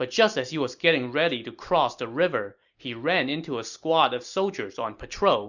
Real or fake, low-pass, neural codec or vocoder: real; 7.2 kHz; none